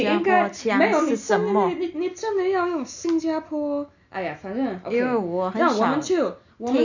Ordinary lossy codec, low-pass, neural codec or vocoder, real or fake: none; 7.2 kHz; none; real